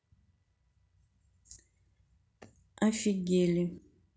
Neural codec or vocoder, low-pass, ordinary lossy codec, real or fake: none; none; none; real